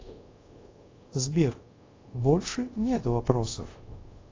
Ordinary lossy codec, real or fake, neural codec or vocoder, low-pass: AAC, 32 kbps; fake; codec, 24 kHz, 0.5 kbps, DualCodec; 7.2 kHz